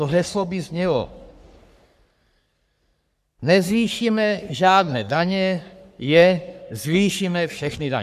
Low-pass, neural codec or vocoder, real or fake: 14.4 kHz; codec, 44.1 kHz, 3.4 kbps, Pupu-Codec; fake